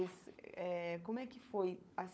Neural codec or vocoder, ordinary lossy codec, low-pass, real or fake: codec, 16 kHz, 16 kbps, FunCodec, trained on LibriTTS, 50 frames a second; none; none; fake